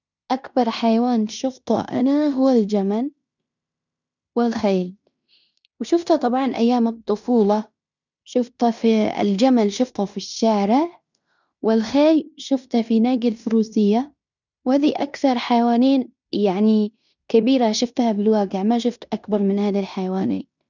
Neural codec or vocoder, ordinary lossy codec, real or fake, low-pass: codec, 16 kHz in and 24 kHz out, 0.9 kbps, LongCat-Audio-Codec, fine tuned four codebook decoder; none; fake; 7.2 kHz